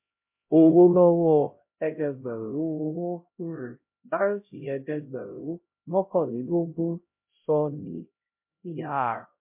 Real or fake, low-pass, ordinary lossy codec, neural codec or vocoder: fake; 3.6 kHz; MP3, 32 kbps; codec, 16 kHz, 0.5 kbps, X-Codec, HuBERT features, trained on LibriSpeech